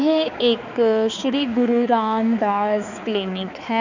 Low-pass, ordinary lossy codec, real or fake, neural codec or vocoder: 7.2 kHz; none; fake; codec, 16 kHz, 4 kbps, X-Codec, HuBERT features, trained on balanced general audio